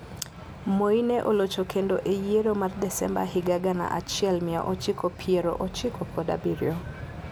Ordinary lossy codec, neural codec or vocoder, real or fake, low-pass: none; none; real; none